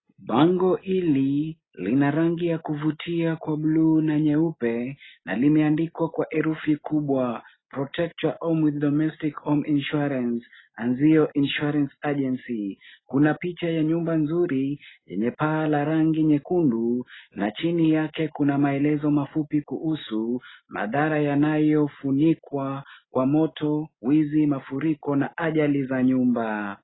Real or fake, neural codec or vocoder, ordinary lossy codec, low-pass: real; none; AAC, 16 kbps; 7.2 kHz